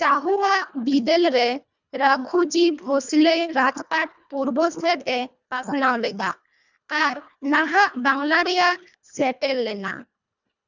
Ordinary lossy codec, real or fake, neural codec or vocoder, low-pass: none; fake; codec, 24 kHz, 1.5 kbps, HILCodec; 7.2 kHz